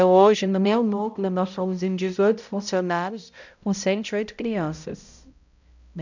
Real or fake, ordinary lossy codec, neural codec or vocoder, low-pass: fake; none; codec, 16 kHz, 0.5 kbps, X-Codec, HuBERT features, trained on balanced general audio; 7.2 kHz